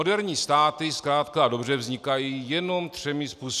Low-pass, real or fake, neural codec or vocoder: 14.4 kHz; real; none